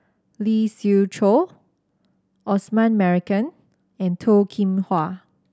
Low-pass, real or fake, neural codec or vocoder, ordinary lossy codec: none; real; none; none